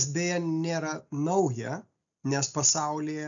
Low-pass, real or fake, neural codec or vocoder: 7.2 kHz; real; none